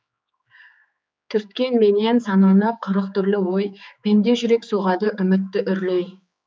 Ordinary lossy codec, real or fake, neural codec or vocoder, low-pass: none; fake; codec, 16 kHz, 4 kbps, X-Codec, HuBERT features, trained on general audio; none